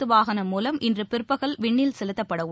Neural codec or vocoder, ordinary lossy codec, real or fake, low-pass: none; none; real; none